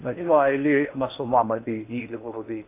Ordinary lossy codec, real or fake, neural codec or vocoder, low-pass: AAC, 24 kbps; fake; codec, 16 kHz in and 24 kHz out, 0.6 kbps, FocalCodec, streaming, 4096 codes; 3.6 kHz